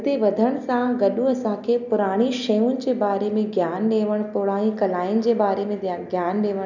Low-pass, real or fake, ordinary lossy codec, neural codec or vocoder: 7.2 kHz; real; none; none